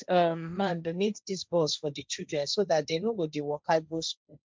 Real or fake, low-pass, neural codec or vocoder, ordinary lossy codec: fake; none; codec, 16 kHz, 1.1 kbps, Voila-Tokenizer; none